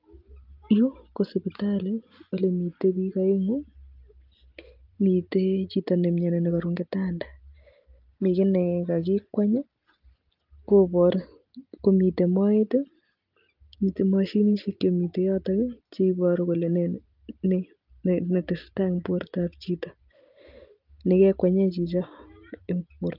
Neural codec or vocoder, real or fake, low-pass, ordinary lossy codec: none; real; 5.4 kHz; Opus, 24 kbps